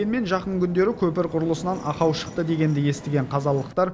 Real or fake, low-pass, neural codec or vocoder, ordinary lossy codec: real; none; none; none